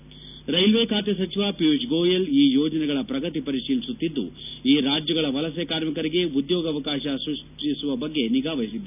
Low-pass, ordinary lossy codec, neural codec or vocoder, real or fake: 3.6 kHz; none; none; real